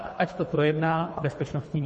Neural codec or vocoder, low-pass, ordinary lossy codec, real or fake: codec, 24 kHz, 3 kbps, HILCodec; 10.8 kHz; MP3, 32 kbps; fake